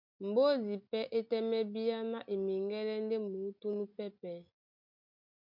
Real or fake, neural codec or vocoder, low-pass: real; none; 5.4 kHz